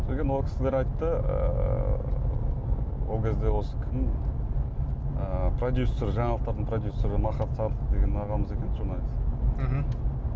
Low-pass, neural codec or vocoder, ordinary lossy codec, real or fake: none; none; none; real